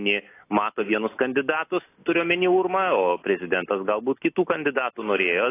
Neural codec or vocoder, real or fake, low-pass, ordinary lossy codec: none; real; 3.6 kHz; AAC, 24 kbps